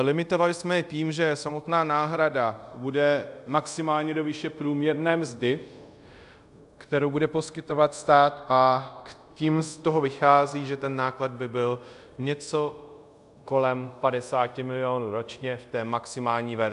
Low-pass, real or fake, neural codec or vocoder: 10.8 kHz; fake; codec, 24 kHz, 0.5 kbps, DualCodec